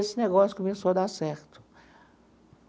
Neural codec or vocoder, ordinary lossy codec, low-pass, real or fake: none; none; none; real